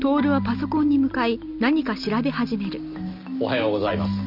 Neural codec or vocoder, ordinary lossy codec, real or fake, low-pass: none; none; real; 5.4 kHz